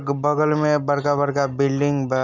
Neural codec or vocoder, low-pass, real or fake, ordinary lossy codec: none; 7.2 kHz; real; none